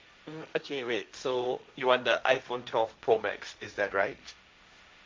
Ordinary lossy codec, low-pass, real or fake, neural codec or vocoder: none; none; fake; codec, 16 kHz, 1.1 kbps, Voila-Tokenizer